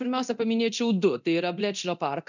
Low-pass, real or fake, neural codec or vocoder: 7.2 kHz; fake; codec, 24 kHz, 0.9 kbps, DualCodec